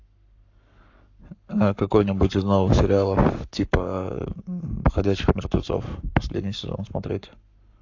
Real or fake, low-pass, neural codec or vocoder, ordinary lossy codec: fake; 7.2 kHz; codec, 44.1 kHz, 7.8 kbps, Pupu-Codec; AAC, 48 kbps